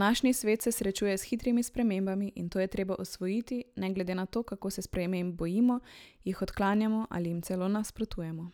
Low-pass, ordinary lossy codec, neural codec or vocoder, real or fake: none; none; none; real